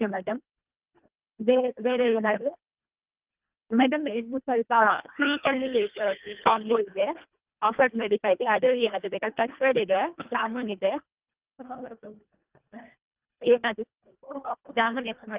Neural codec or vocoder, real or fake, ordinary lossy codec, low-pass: codec, 24 kHz, 1.5 kbps, HILCodec; fake; Opus, 32 kbps; 3.6 kHz